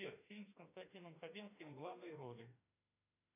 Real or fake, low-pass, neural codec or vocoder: fake; 3.6 kHz; autoencoder, 48 kHz, 32 numbers a frame, DAC-VAE, trained on Japanese speech